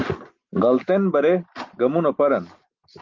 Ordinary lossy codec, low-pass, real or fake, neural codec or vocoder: Opus, 32 kbps; 7.2 kHz; real; none